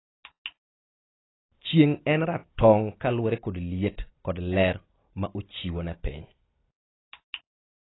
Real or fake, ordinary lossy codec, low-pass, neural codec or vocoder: real; AAC, 16 kbps; 7.2 kHz; none